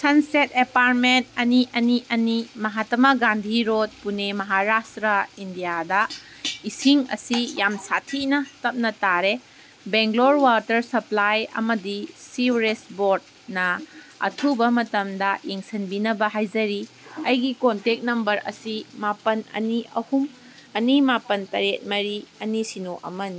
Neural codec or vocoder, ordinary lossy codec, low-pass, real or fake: none; none; none; real